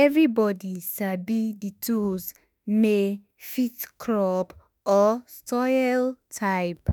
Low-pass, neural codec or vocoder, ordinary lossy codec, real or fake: none; autoencoder, 48 kHz, 32 numbers a frame, DAC-VAE, trained on Japanese speech; none; fake